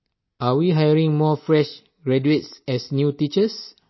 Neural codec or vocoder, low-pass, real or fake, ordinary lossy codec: none; 7.2 kHz; real; MP3, 24 kbps